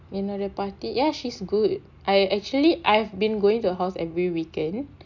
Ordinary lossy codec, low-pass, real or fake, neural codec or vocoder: none; 7.2 kHz; real; none